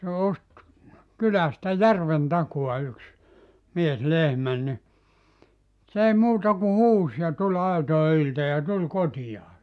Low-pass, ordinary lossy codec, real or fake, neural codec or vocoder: none; none; real; none